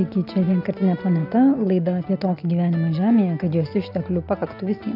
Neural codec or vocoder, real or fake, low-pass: none; real; 5.4 kHz